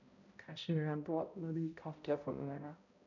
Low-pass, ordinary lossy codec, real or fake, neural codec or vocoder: 7.2 kHz; none; fake; codec, 16 kHz, 0.5 kbps, X-Codec, HuBERT features, trained on balanced general audio